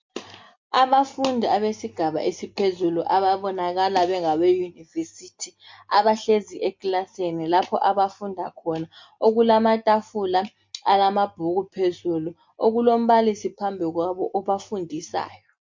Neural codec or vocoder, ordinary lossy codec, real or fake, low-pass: none; MP3, 48 kbps; real; 7.2 kHz